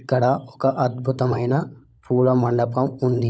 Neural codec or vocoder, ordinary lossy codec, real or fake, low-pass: codec, 16 kHz, 16 kbps, FunCodec, trained on LibriTTS, 50 frames a second; none; fake; none